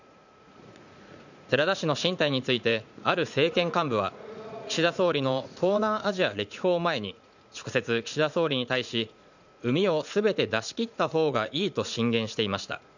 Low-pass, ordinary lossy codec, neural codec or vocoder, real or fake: 7.2 kHz; none; vocoder, 44.1 kHz, 80 mel bands, Vocos; fake